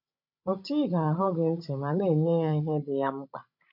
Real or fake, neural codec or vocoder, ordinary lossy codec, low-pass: fake; codec, 16 kHz, 16 kbps, FreqCodec, larger model; none; 5.4 kHz